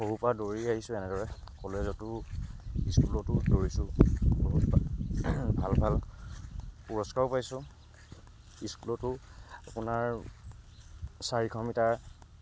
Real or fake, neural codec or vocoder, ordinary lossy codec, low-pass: real; none; none; none